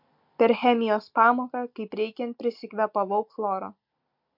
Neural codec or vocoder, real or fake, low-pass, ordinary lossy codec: none; real; 5.4 kHz; MP3, 48 kbps